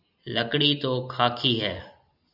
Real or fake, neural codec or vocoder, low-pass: real; none; 5.4 kHz